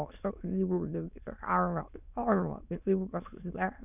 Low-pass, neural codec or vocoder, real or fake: 3.6 kHz; autoencoder, 22.05 kHz, a latent of 192 numbers a frame, VITS, trained on many speakers; fake